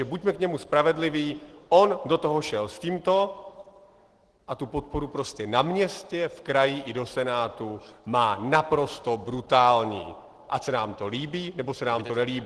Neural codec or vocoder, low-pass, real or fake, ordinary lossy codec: none; 10.8 kHz; real; Opus, 16 kbps